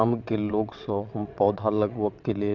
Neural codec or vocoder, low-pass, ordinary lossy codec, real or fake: vocoder, 22.05 kHz, 80 mel bands, WaveNeXt; 7.2 kHz; none; fake